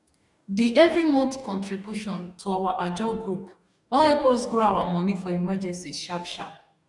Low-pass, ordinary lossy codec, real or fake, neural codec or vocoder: 10.8 kHz; none; fake; codec, 44.1 kHz, 2.6 kbps, DAC